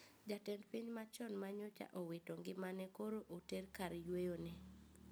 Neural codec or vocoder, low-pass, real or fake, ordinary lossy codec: none; none; real; none